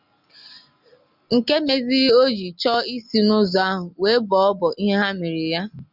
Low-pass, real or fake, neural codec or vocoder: 5.4 kHz; real; none